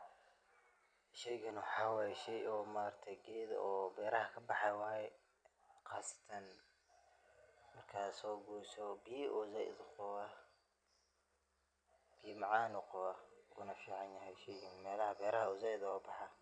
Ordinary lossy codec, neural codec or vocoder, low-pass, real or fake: none; none; 9.9 kHz; real